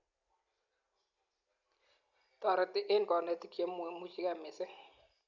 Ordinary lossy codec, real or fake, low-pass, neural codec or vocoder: none; real; 7.2 kHz; none